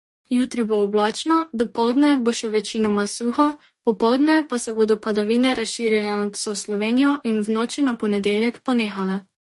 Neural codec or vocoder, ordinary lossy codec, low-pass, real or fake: codec, 44.1 kHz, 2.6 kbps, DAC; MP3, 48 kbps; 14.4 kHz; fake